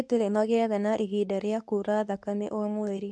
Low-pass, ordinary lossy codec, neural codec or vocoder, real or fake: none; none; codec, 24 kHz, 0.9 kbps, WavTokenizer, medium speech release version 2; fake